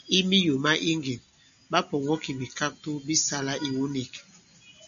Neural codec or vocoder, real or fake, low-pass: none; real; 7.2 kHz